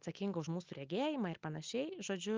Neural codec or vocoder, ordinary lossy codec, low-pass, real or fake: none; Opus, 24 kbps; 7.2 kHz; real